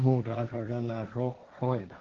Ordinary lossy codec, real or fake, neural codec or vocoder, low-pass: Opus, 16 kbps; fake; codec, 16 kHz, 1.1 kbps, Voila-Tokenizer; 7.2 kHz